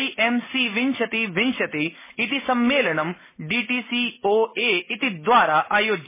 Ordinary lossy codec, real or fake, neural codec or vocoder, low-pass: MP3, 16 kbps; real; none; 3.6 kHz